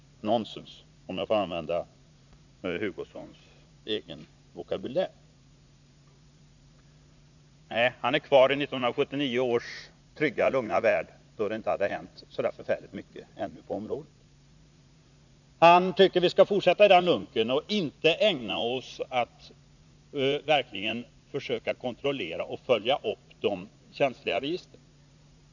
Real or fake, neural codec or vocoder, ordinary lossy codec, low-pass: fake; vocoder, 44.1 kHz, 80 mel bands, Vocos; none; 7.2 kHz